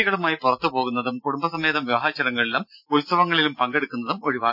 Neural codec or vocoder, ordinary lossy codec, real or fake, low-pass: none; none; real; 5.4 kHz